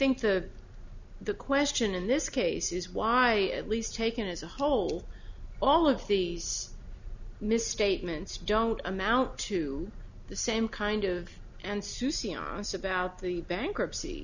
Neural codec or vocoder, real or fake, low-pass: none; real; 7.2 kHz